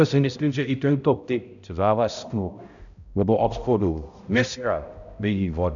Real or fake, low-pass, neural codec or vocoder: fake; 7.2 kHz; codec, 16 kHz, 0.5 kbps, X-Codec, HuBERT features, trained on balanced general audio